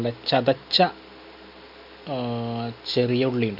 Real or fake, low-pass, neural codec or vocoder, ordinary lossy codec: real; 5.4 kHz; none; none